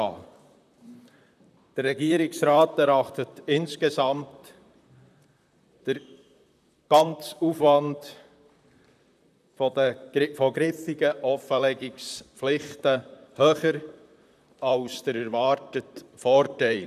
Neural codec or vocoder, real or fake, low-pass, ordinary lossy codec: vocoder, 44.1 kHz, 128 mel bands, Pupu-Vocoder; fake; 14.4 kHz; none